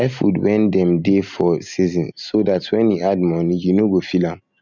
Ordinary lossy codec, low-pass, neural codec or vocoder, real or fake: none; 7.2 kHz; none; real